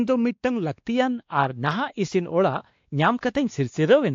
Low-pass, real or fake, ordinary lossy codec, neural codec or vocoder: 7.2 kHz; fake; AAC, 48 kbps; codec, 16 kHz, 2 kbps, X-Codec, WavLM features, trained on Multilingual LibriSpeech